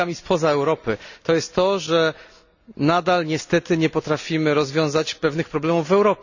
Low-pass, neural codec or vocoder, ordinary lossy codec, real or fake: 7.2 kHz; none; none; real